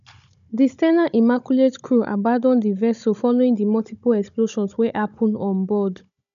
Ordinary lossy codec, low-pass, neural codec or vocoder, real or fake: none; 7.2 kHz; codec, 16 kHz, 16 kbps, FunCodec, trained on Chinese and English, 50 frames a second; fake